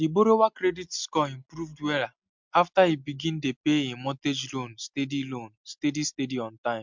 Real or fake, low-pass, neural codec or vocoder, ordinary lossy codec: real; 7.2 kHz; none; MP3, 64 kbps